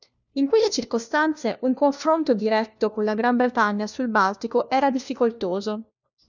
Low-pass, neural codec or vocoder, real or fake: 7.2 kHz; codec, 16 kHz, 1 kbps, FunCodec, trained on LibriTTS, 50 frames a second; fake